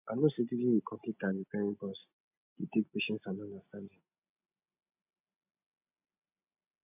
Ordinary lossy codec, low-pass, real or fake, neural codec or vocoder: none; 3.6 kHz; real; none